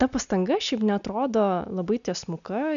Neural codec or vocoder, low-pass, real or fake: none; 7.2 kHz; real